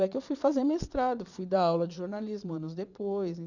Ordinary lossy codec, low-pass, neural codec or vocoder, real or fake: none; 7.2 kHz; vocoder, 22.05 kHz, 80 mel bands, Vocos; fake